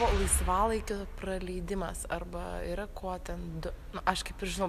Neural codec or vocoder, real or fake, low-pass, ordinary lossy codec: none; real; 14.4 kHz; AAC, 96 kbps